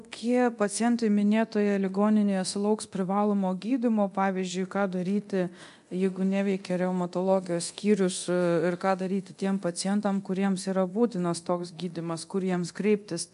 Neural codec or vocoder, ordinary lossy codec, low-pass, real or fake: codec, 24 kHz, 0.9 kbps, DualCodec; MP3, 64 kbps; 10.8 kHz; fake